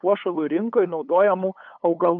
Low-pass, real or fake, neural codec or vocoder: 7.2 kHz; fake; codec, 16 kHz, 8 kbps, FunCodec, trained on LibriTTS, 25 frames a second